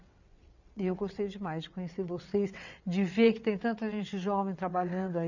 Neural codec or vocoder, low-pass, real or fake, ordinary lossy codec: vocoder, 22.05 kHz, 80 mel bands, WaveNeXt; 7.2 kHz; fake; none